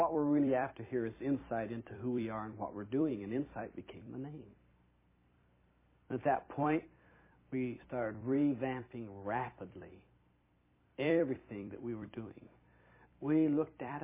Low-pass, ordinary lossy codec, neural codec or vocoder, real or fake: 3.6 kHz; MP3, 16 kbps; vocoder, 44.1 kHz, 128 mel bands every 256 samples, BigVGAN v2; fake